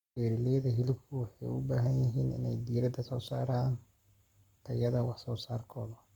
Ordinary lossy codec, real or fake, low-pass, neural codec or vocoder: Opus, 64 kbps; fake; 19.8 kHz; codec, 44.1 kHz, 7.8 kbps, Pupu-Codec